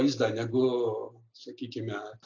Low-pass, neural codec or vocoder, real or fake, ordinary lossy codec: 7.2 kHz; none; real; AAC, 48 kbps